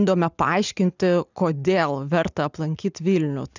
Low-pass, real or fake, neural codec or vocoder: 7.2 kHz; real; none